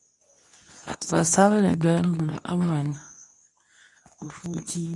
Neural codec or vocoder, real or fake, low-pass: codec, 24 kHz, 0.9 kbps, WavTokenizer, medium speech release version 2; fake; 10.8 kHz